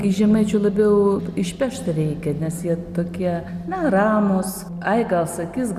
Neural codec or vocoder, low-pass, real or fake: none; 14.4 kHz; real